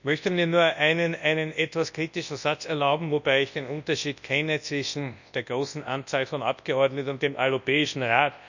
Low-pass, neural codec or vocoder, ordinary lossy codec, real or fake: 7.2 kHz; codec, 24 kHz, 0.9 kbps, WavTokenizer, large speech release; none; fake